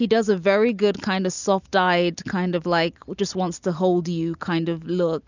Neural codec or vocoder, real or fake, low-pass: none; real; 7.2 kHz